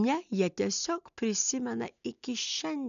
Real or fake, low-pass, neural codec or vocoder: real; 7.2 kHz; none